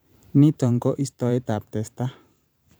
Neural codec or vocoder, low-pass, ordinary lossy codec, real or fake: vocoder, 44.1 kHz, 128 mel bands every 512 samples, BigVGAN v2; none; none; fake